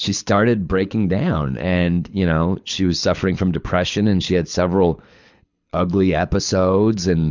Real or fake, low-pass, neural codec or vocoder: fake; 7.2 kHz; codec, 24 kHz, 6 kbps, HILCodec